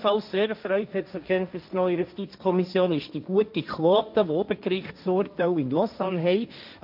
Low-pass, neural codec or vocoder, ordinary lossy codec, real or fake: 5.4 kHz; codec, 16 kHz, 1.1 kbps, Voila-Tokenizer; none; fake